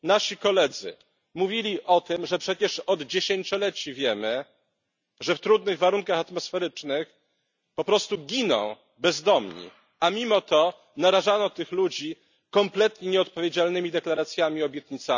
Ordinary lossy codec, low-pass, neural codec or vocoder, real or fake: none; 7.2 kHz; none; real